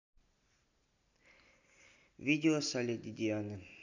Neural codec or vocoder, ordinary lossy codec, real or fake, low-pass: none; none; real; 7.2 kHz